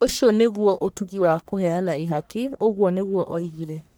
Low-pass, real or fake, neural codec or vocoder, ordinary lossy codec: none; fake; codec, 44.1 kHz, 1.7 kbps, Pupu-Codec; none